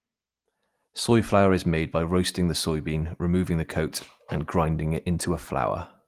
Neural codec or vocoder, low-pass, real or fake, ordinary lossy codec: none; 14.4 kHz; real; Opus, 24 kbps